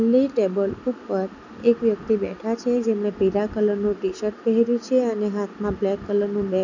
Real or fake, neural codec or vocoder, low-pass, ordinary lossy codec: real; none; 7.2 kHz; none